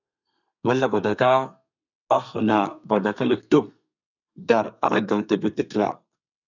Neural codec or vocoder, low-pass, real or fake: codec, 32 kHz, 1.9 kbps, SNAC; 7.2 kHz; fake